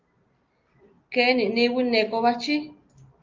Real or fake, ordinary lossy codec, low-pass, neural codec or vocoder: real; Opus, 24 kbps; 7.2 kHz; none